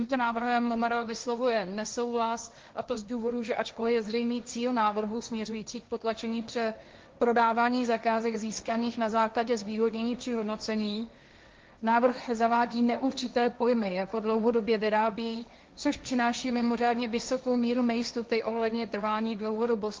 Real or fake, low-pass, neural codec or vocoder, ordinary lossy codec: fake; 7.2 kHz; codec, 16 kHz, 1.1 kbps, Voila-Tokenizer; Opus, 32 kbps